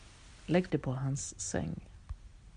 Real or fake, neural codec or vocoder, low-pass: real; none; 9.9 kHz